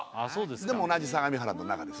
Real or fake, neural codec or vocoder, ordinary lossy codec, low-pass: real; none; none; none